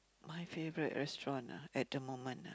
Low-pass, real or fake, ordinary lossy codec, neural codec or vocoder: none; real; none; none